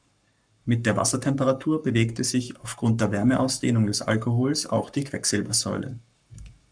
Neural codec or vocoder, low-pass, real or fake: codec, 44.1 kHz, 7.8 kbps, Pupu-Codec; 9.9 kHz; fake